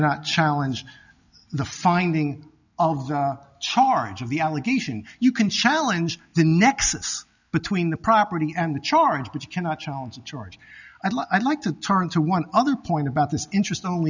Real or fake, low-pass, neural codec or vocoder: real; 7.2 kHz; none